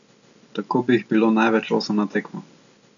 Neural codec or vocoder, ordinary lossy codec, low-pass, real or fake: none; none; 7.2 kHz; real